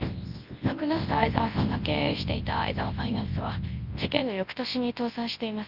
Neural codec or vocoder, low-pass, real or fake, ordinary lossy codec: codec, 24 kHz, 0.9 kbps, WavTokenizer, large speech release; 5.4 kHz; fake; Opus, 24 kbps